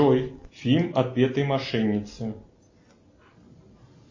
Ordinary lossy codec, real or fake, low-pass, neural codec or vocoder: MP3, 32 kbps; real; 7.2 kHz; none